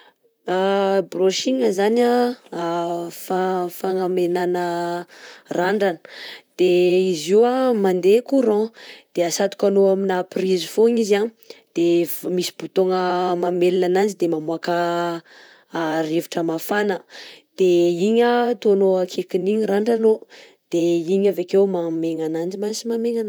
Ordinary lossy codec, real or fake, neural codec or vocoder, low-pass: none; fake; vocoder, 44.1 kHz, 128 mel bands, Pupu-Vocoder; none